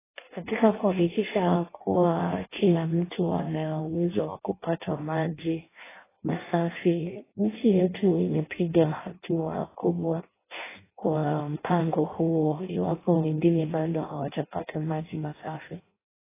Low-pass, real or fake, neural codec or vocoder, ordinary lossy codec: 3.6 kHz; fake; codec, 16 kHz in and 24 kHz out, 0.6 kbps, FireRedTTS-2 codec; AAC, 16 kbps